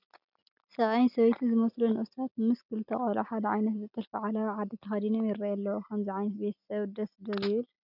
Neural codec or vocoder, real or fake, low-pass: none; real; 5.4 kHz